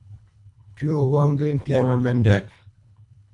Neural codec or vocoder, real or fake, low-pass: codec, 24 kHz, 1.5 kbps, HILCodec; fake; 10.8 kHz